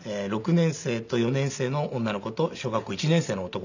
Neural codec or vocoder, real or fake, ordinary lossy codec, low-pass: none; real; none; 7.2 kHz